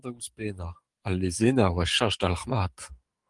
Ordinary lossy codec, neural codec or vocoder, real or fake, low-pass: Opus, 24 kbps; codec, 44.1 kHz, 7.8 kbps, DAC; fake; 10.8 kHz